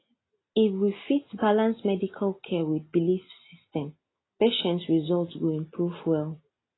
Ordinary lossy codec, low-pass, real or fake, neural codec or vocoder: AAC, 16 kbps; 7.2 kHz; real; none